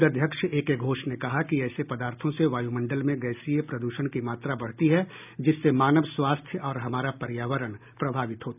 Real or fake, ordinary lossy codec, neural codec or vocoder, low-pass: real; none; none; 3.6 kHz